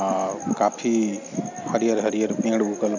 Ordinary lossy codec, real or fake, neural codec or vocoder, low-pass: none; real; none; 7.2 kHz